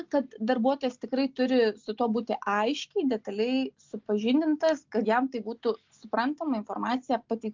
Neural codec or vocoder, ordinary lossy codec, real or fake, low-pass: none; MP3, 64 kbps; real; 7.2 kHz